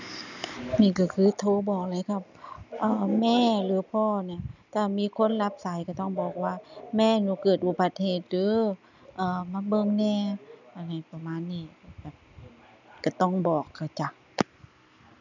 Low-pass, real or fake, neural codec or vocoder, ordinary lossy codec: 7.2 kHz; real; none; none